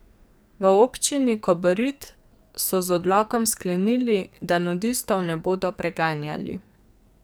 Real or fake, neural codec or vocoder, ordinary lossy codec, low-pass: fake; codec, 44.1 kHz, 2.6 kbps, SNAC; none; none